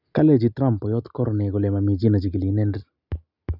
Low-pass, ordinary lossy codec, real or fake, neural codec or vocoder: 5.4 kHz; none; real; none